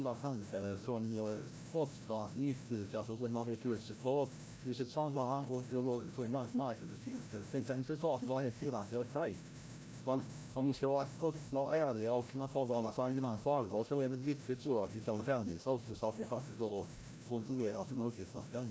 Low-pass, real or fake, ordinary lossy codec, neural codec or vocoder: none; fake; none; codec, 16 kHz, 0.5 kbps, FreqCodec, larger model